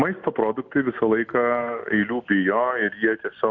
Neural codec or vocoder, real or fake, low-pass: none; real; 7.2 kHz